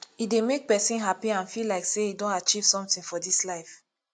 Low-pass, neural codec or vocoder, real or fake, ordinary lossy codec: 9.9 kHz; none; real; AAC, 64 kbps